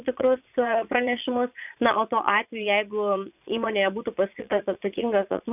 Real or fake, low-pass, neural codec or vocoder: fake; 3.6 kHz; vocoder, 22.05 kHz, 80 mel bands, Vocos